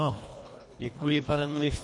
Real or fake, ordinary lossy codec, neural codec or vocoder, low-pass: fake; MP3, 48 kbps; codec, 24 kHz, 1.5 kbps, HILCodec; 10.8 kHz